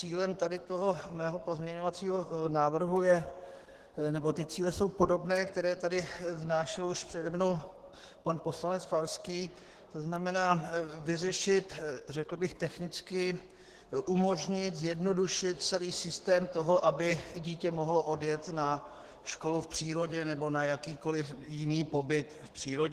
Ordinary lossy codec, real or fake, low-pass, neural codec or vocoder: Opus, 16 kbps; fake; 14.4 kHz; codec, 32 kHz, 1.9 kbps, SNAC